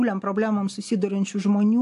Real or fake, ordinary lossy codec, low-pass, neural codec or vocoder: real; AAC, 64 kbps; 10.8 kHz; none